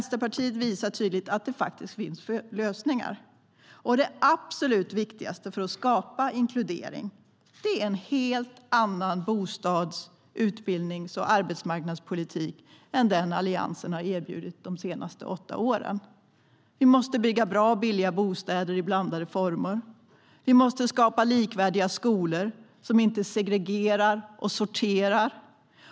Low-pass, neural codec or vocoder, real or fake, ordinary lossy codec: none; none; real; none